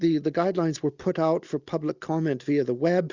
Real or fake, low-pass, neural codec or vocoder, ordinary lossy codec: real; 7.2 kHz; none; Opus, 64 kbps